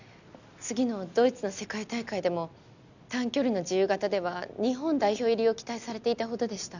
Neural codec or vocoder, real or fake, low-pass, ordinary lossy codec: none; real; 7.2 kHz; none